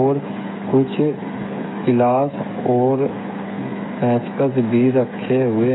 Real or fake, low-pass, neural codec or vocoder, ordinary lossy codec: fake; 7.2 kHz; codec, 16 kHz, 8 kbps, FreqCodec, smaller model; AAC, 16 kbps